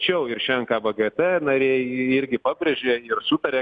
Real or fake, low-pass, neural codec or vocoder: real; 9.9 kHz; none